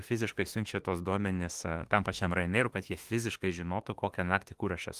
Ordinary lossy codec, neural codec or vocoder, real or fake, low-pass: Opus, 24 kbps; autoencoder, 48 kHz, 32 numbers a frame, DAC-VAE, trained on Japanese speech; fake; 14.4 kHz